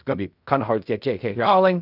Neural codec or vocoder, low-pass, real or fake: codec, 16 kHz in and 24 kHz out, 0.4 kbps, LongCat-Audio-Codec, fine tuned four codebook decoder; 5.4 kHz; fake